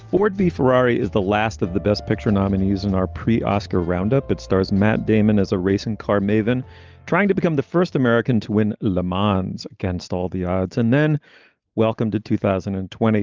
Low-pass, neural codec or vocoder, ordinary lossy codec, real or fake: 7.2 kHz; none; Opus, 24 kbps; real